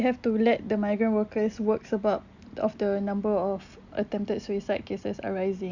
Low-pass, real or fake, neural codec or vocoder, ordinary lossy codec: 7.2 kHz; real; none; none